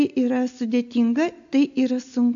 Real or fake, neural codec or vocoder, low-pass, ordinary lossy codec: real; none; 7.2 kHz; AAC, 64 kbps